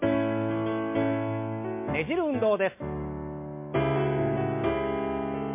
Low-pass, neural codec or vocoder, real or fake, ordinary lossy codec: 3.6 kHz; none; real; MP3, 24 kbps